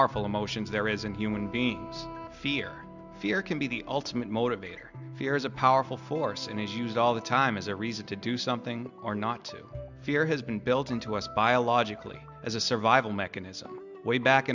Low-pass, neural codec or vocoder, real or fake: 7.2 kHz; none; real